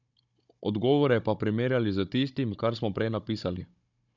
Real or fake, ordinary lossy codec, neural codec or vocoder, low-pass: fake; none; codec, 16 kHz, 16 kbps, FunCodec, trained on Chinese and English, 50 frames a second; 7.2 kHz